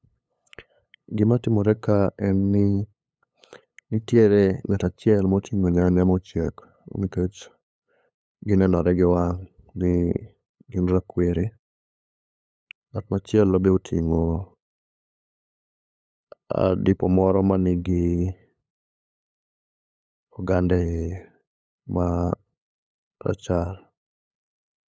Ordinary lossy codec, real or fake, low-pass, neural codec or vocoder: none; fake; none; codec, 16 kHz, 8 kbps, FunCodec, trained on LibriTTS, 25 frames a second